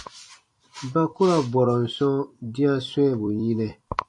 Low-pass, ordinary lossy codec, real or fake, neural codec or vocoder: 10.8 kHz; MP3, 48 kbps; fake; vocoder, 44.1 kHz, 128 mel bands every 256 samples, BigVGAN v2